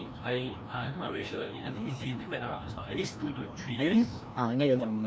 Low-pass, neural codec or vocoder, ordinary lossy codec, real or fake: none; codec, 16 kHz, 1 kbps, FreqCodec, larger model; none; fake